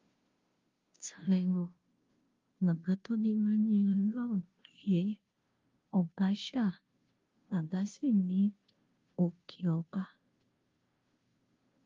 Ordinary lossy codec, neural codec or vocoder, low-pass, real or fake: Opus, 32 kbps; codec, 16 kHz, 0.5 kbps, FunCodec, trained on Chinese and English, 25 frames a second; 7.2 kHz; fake